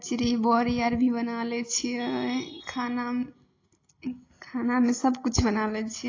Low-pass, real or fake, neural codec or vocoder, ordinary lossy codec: 7.2 kHz; real; none; AAC, 32 kbps